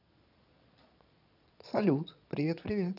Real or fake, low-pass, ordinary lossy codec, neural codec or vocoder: fake; 5.4 kHz; none; vocoder, 22.05 kHz, 80 mel bands, Vocos